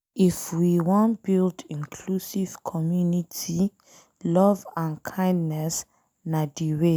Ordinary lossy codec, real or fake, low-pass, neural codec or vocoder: none; real; none; none